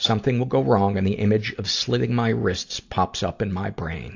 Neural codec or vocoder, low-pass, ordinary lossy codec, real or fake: none; 7.2 kHz; AAC, 48 kbps; real